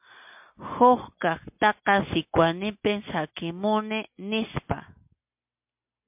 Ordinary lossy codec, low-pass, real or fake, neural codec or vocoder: MP3, 32 kbps; 3.6 kHz; real; none